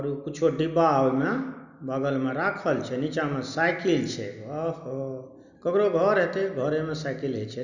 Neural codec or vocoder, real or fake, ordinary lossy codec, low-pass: none; real; none; 7.2 kHz